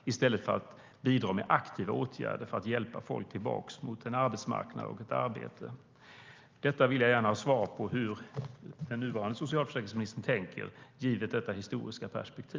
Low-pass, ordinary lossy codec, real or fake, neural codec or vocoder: 7.2 kHz; Opus, 24 kbps; real; none